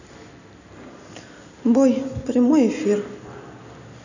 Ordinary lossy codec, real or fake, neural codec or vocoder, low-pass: none; real; none; 7.2 kHz